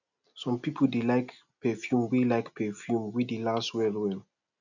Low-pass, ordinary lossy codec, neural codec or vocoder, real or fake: 7.2 kHz; none; none; real